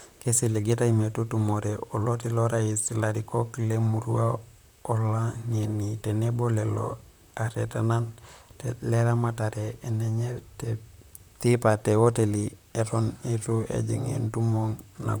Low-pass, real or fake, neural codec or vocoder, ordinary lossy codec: none; fake; vocoder, 44.1 kHz, 128 mel bands, Pupu-Vocoder; none